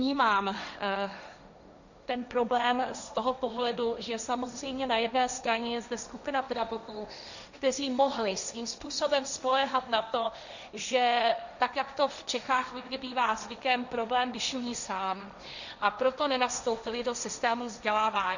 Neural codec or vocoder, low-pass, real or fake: codec, 16 kHz, 1.1 kbps, Voila-Tokenizer; 7.2 kHz; fake